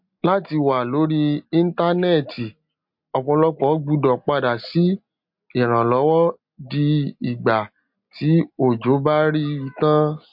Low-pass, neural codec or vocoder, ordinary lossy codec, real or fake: 5.4 kHz; none; MP3, 48 kbps; real